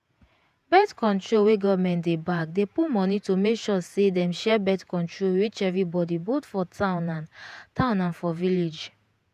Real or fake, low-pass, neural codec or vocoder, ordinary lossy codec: fake; 14.4 kHz; vocoder, 48 kHz, 128 mel bands, Vocos; none